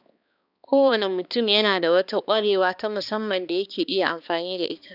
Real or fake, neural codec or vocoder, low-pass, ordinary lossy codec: fake; codec, 16 kHz, 2 kbps, X-Codec, HuBERT features, trained on balanced general audio; 5.4 kHz; none